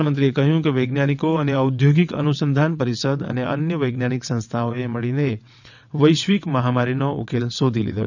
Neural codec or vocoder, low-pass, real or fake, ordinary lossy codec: vocoder, 22.05 kHz, 80 mel bands, WaveNeXt; 7.2 kHz; fake; none